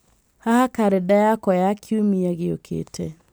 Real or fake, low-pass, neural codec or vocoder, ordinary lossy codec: real; none; none; none